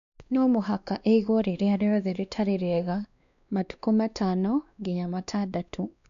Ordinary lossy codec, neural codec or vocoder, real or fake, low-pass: none; codec, 16 kHz, 2 kbps, X-Codec, WavLM features, trained on Multilingual LibriSpeech; fake; 7.2 kHz